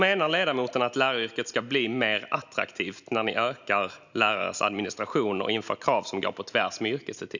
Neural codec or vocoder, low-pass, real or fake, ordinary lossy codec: none; 7.2 kHz; real; none